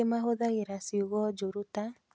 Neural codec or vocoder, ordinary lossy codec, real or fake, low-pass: none; none; real; none